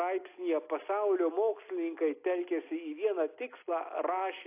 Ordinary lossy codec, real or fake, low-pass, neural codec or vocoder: AAC, 24 kbps; real; 3.6 kHz; none